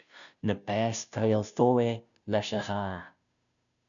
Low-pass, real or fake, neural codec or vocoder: 7.2 kHz; fake; codec, 16 kHz, 0.5 kbps, FunCodec, trained on Chinese and English, 25 frames a second